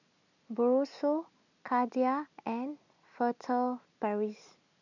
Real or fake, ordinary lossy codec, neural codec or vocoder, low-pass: real; none; none; 7.2 kHz